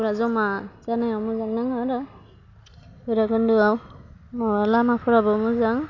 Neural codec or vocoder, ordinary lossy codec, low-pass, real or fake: none; none; 7.2 kHz; real